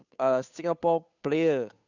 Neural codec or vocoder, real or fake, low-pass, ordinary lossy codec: codec, 16 kHz, 2 kbps, FunCodec, trained on Chinese and English, 25 frames a second; fake; 7.2 kHz; none